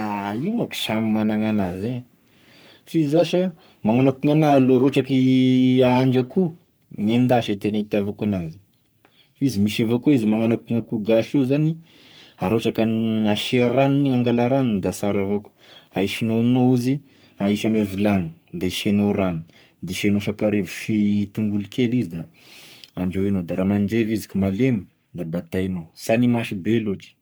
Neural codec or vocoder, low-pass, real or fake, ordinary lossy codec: codec, 44.1 kHz, 3.4 kbps, Pupu-Codec; none; fake; none